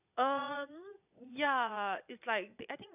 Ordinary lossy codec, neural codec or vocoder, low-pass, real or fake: none; vocoder, 22.05 kHz, 80 mel bands, Vocos; 3.6 kHz; fake